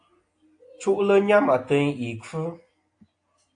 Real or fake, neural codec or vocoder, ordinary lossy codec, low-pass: real; none; AAC, 48 kbps; 9.9 kHz